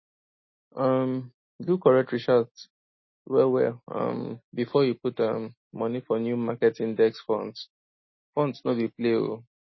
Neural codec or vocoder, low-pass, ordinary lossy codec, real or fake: none; 7.2 kHz; MP3, 24 kbps; real